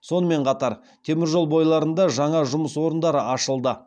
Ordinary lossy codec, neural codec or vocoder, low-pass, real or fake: none; none; 9.9 kHz; real